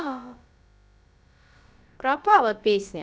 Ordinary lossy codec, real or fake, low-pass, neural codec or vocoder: none; fake; none; codec, 16 kHz, about 1 kbps, DyCAST, with the encoder's durations